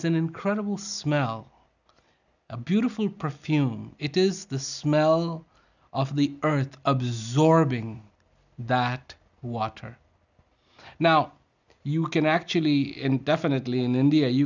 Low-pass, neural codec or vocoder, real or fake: 7.2 kHz; none; real